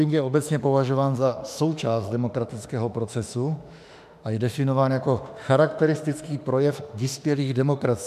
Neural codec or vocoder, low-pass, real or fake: autoencoder, 48 kHz, 32 numbers a frame, DAC-VAE, trained on Japanese speech; 14.4 kHz; fake